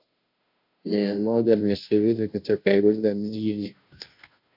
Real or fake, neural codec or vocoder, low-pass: fake; codec, 16 kHz, 0.5 kbps, FunCodec, trained on Chinese and English, 25 frames a second; 5.4 kHz